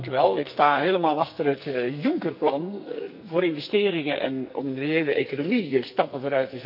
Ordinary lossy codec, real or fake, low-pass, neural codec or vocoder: none; fake; 5.4 kHz; codec, 44.1 kHz, 2.6 kbps, SNAC